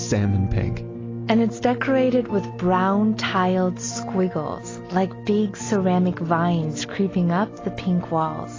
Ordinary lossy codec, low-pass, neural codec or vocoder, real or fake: AAC, 32 kbps; 7.2 kHz; none; real